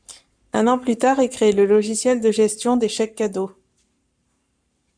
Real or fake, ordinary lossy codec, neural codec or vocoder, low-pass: fake; MP3, 96 kbps; codec, 44.1 kHz, 7.8 kbps, Pupu-Codec; 9.9 kHz